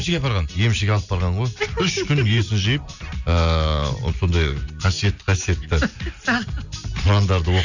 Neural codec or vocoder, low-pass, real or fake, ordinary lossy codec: none; 7.2 kHz; real; none